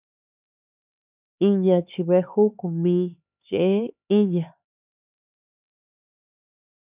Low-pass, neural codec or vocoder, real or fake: 3.6 kHz; codec, 16 kHz, 4 kbps, X-Codec, HuBERT features, trained on LibriSpeech; fake